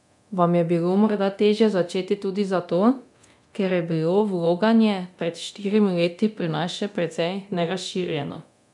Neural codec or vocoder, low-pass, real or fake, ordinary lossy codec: codec, 24 kHz, 0.9 kbps, DualCodec; 10.8 kHz; fake; none